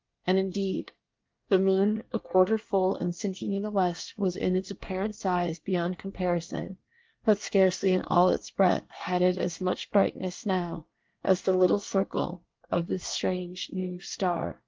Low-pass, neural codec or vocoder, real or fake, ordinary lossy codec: 7.2 kHz; codec, 24 kHz, 1 kbps, SNAC; fake; Opus, 32 kbps